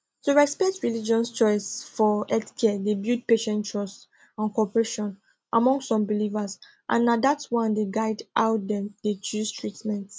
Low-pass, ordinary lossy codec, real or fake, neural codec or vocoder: none; none; real; none